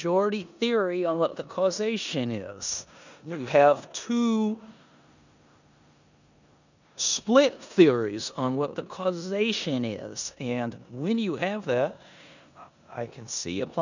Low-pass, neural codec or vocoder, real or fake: 7.2 kHz; codec, 16 kHz in and 24 kHz out, 0.9 kbps, LongCat-Audio-Codec, four codebook decoder; fake